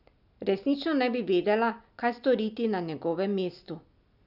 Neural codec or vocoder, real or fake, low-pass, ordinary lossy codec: none; real; 5.4 kHz; none